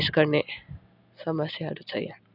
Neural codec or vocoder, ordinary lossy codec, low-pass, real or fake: none; none; 5.4 kHz; real